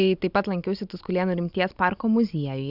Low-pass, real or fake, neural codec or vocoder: 5.4 kHz; real; none